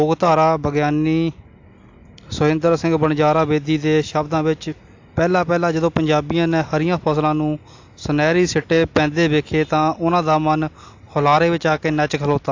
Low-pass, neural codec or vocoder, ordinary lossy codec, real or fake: 7.2 kHz; none; AAC, 48 kbps; real